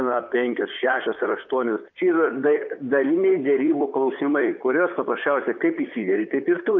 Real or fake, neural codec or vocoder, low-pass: fake; vocoder, 44.1 kHz, 128 mel bands, Pupu-Vocoder; 7.2 kHz